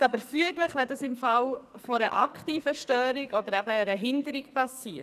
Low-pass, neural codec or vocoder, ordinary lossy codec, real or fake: 14.4 kHz; codec, 44.1 kHz, 2.6 kbps, SNAC; none; fake